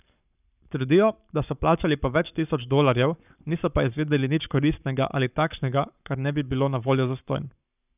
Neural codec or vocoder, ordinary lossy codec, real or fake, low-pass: codec, 44.1 kHz, 7.8 kbps, Pupu-Codec; none; fake; 3.6 kHz